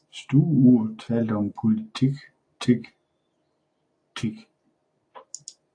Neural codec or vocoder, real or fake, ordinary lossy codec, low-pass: none; real; AAC, 48 kbps; 9.9 kHz